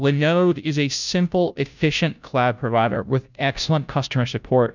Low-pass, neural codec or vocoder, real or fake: 7.2 kHz; codec, 16 kHz, 0.5 kbps, FunCodec, trained on Chinese and English, 25 frames a second; fake